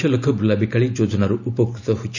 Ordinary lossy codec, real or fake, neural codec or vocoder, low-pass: none; real; none; 7.2 kHz